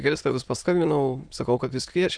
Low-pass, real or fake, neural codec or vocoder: 9.9 kHz; fake; autoencoder, 22.05 kHz, a latent of 192 numbers a frame, VITS, trained on many speakers